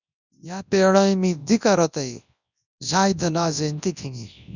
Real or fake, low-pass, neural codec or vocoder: fake; 7.2 kHz; codec, 24 kHz, 0.9 kbps, WavTokenizer, large speech release